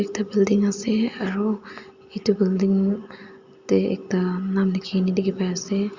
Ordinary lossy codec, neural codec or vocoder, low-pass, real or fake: Opus, 64 kbps; none; 7.2 kHz; real